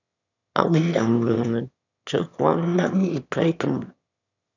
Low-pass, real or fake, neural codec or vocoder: 7.2 kHz; fake; autoencoder, 22.05 kHz, a latent of 192 numbers a frame, VITS, trained on one speaker